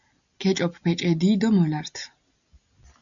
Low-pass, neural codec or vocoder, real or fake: 7.2 kHz; none; real